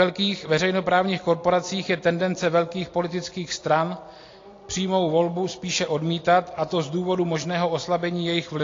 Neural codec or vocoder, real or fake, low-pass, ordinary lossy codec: none; real; 7.2 kHz; AAC, 32 kbps